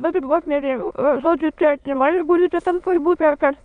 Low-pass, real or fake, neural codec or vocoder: 9.9 kHz; fake; autoencoder, 22.05 kHz, a latent of 192 numbers a frame, VITS, trained on many speakers